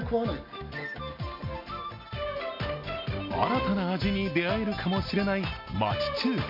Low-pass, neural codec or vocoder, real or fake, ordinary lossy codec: 5.4 kHz; none; real; MP3, 32 kbps